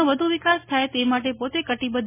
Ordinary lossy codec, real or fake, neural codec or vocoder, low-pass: MP3, 16 kbps; real; none; 3.6 kHz